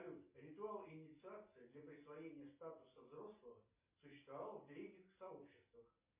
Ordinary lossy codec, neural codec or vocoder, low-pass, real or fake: MP3, 24 kbps; none; 3.6 kHz; real